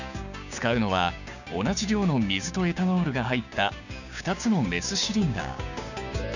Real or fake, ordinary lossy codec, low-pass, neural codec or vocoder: fake; none; 7.2 kHz; codec, 16 kHz, 6 kbps, DAC